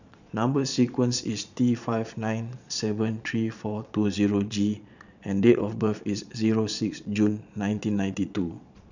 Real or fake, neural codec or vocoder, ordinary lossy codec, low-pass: fake; vocoder, 22.05 kHz, 80 mel bands, Vocos; none; 7.2 kHz